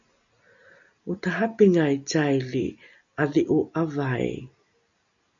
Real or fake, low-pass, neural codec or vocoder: real; 7.2 kHz; none